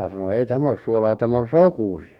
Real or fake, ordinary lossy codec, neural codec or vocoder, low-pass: fake; none; codec, 44.1 kHz, 2.6 kbps, DAC; 19.8 kHz